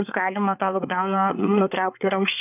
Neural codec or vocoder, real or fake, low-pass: codec, 24 kHz, 1 kbps, SNAC; fake; 3.6 kHz